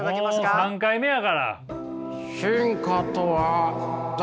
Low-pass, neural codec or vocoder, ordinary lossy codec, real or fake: none; none; none; real